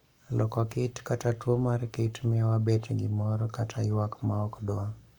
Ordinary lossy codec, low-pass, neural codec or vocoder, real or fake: none; 19.8 kHz; codec, 44.1 kHz, 7.8 kbps, Pupu-Codec; fake